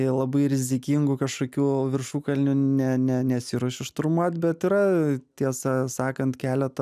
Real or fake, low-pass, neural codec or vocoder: real; 14.4 kHz; none